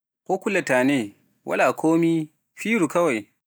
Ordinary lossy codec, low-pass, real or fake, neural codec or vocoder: none; none; real; none